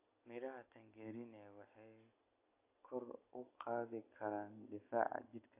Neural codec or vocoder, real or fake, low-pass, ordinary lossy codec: none; real; 3.6 kHz; AAC, 24 kbps